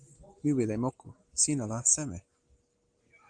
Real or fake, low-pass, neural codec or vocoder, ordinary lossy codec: real; 9.9 kHz; none; Opus, 24 kbps